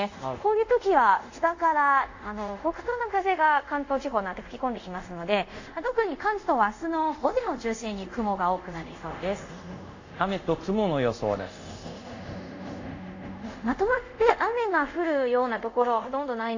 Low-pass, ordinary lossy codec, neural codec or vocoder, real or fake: 7.2 kHz; AAC, 48 kbps; codec, 24 kHz, 0.5 kbps, DualCodec; fake